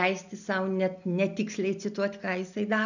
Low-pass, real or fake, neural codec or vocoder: 7.2 kHz; real; none